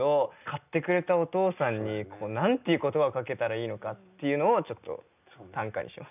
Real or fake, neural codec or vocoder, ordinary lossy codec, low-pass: real; none; none; 3.6 kHz